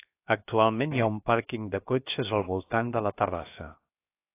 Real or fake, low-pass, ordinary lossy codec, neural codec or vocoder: fake; 3.6 kHz; AAC, 24 kbps; codec, 16 kHz, 0.7 kbps, FocalCodec